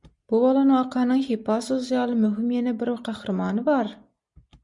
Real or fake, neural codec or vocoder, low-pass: real; none; 10.8 kHz